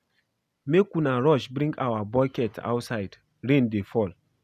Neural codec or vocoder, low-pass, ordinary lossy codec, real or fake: none; 14.4 kHz; none; real